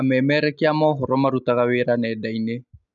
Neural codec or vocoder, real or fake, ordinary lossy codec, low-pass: none; real; none; 7.2 kHz